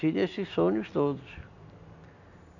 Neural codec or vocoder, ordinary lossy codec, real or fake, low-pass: none; none; real; 7.2 kHz